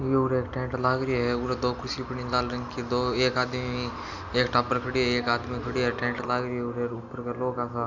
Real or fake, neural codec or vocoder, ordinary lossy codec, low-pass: real; none; none; 7.2 kHz